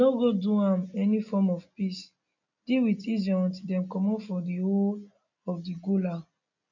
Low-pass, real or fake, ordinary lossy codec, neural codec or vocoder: 7.2 kHz; real; none; none